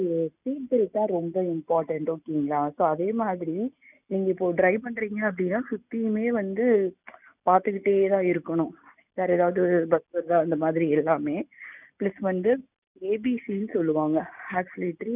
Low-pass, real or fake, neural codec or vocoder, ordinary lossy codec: 3.6 kHz; real; none; none